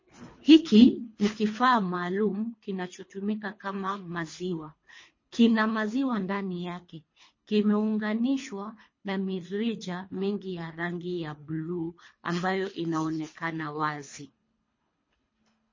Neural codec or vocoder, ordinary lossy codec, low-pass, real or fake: codec, 24 kHz, 3 kbps, HILCodec; MP3, 32 kbps; 7.2 kHz; fake